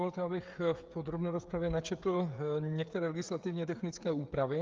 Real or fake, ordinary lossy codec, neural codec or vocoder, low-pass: fake; Opus, 32 kbps; codec, 16 kHz, 16 kbps, FreqCodec, smaller model; 7.2 kHz